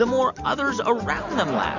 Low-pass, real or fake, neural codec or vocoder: 7.2 kHz; real; none